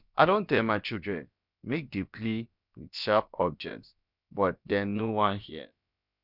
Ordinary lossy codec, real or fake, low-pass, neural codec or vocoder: none; fake; 5.4 kHz; codec, 16 kHz, about 1 kbps, DyCAST, with the encoder's durations